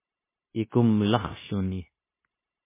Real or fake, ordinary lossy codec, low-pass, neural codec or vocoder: fake; MP3, 16 kbps; 3.6 kHz; codec, 16 kHz, 0.9 kbps, LongCat-Audio-Codec